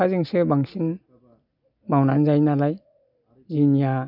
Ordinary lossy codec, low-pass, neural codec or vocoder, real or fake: none; 5.4 kHz; none; real